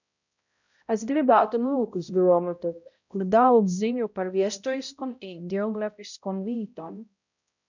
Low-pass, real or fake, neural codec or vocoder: 7.2 kHz; fake; codec, 16 kHz, 0.5 kbps, X-Codec, HuBERT features, trained on balanced general audio